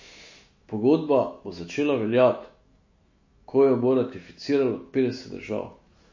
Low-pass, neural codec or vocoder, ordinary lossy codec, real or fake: 7.2 kHz; autoencoder, 48 kHz, 128 numbers a frame, DAC-VAE, trained on Japanese speech; MP3, 32 kbps; fake